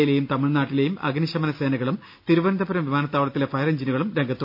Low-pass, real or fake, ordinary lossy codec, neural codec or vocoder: 5.4 kHz; real; none; none